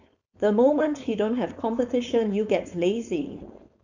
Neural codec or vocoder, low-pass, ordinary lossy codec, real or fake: codec, 16 kHz, 4.8 kbps, FACodec; 7.2 kHz; none; fake